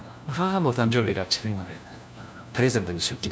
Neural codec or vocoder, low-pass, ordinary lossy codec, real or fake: codec, 16 kHz, 1 kbps, FunCodec, trained on LibriTTS, 50 frames a second; none; none; fake